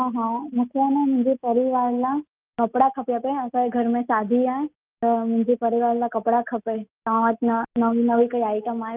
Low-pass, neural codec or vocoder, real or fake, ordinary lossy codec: 3.6 kHz; none; real; Opus, 24 kbps